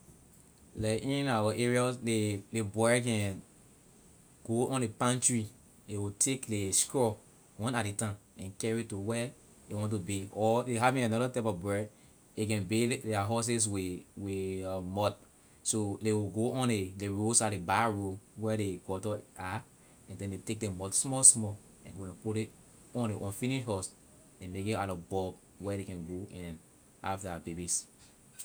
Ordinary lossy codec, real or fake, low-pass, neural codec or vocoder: none; real; none; none